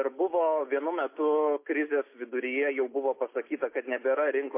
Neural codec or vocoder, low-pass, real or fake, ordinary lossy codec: none; 3.6 kHz; real; MP3, 24 kbps